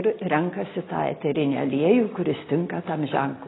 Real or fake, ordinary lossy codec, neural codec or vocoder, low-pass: real; AAC, 16 kbps; none; 7.2 kHz